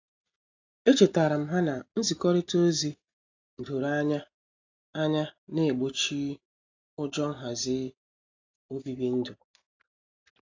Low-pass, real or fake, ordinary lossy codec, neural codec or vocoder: 7.2 kHz; real; AAC, 48 kbps; none